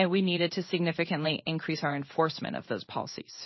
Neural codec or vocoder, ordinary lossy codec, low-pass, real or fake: codec, 16 kHz in and 24 kHz out, 1 kbps, XY-Tokenizer; MP3, 24 kbps; 7.2 kHz; fake